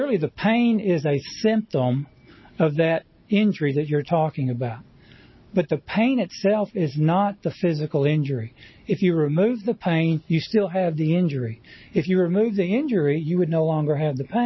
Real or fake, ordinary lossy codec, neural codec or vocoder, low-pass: real; MP3, 24 kbps; none; 7.2 kHz